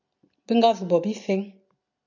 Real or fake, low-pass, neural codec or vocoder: real; 7.2 kHz; none